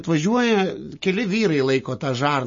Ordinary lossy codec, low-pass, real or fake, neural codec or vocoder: MP3, 32 kbps; 7.2 kHz; real; none